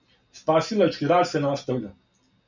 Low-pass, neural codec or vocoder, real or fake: 7.2 kHz; none; real